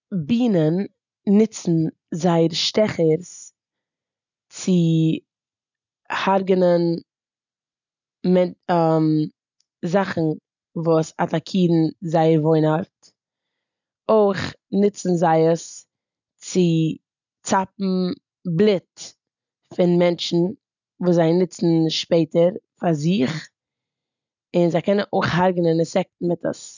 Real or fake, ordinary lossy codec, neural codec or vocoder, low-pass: real; none; none; 7.2 kHz